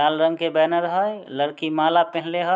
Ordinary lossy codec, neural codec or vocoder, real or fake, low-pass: none; none; real; none